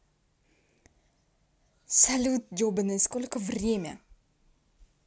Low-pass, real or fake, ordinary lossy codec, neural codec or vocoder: none; real; none; none